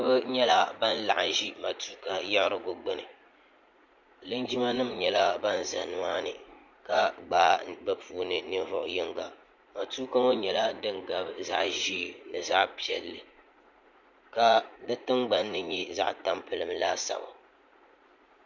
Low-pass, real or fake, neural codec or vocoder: 7.2 kHz; fake; vocoder, 44.1 kHz, 80 mel bands, Vocos